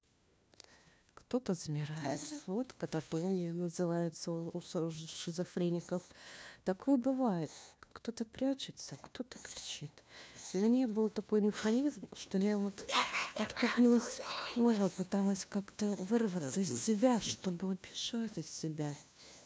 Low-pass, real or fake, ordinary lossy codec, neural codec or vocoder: none; fake; none; codec, 16 kHz, 1 kbps, FunCodec, trained on LibriTTS, 50 frames a second